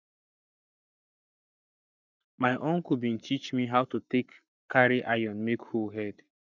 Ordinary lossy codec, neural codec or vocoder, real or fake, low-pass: none; codec, 44.1 kHz, 7.8 kbps, Pupu-Codec; fake; 7.2 kHz